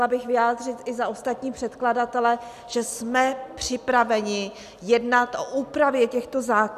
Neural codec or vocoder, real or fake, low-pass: none; real; 14.4 kHz